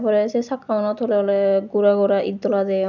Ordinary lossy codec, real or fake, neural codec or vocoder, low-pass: none; real; none; 7.2 kHz